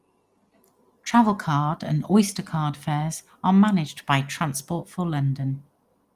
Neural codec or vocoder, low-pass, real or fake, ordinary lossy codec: none; 14.4 kHz; real; Opus, 32 kbps